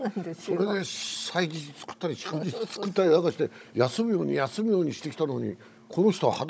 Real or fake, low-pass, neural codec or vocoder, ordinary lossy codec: fake; none; codec, 16 kHz, 16 kbps, FunCodec, trained on Chinese and English, 50 frames a second; none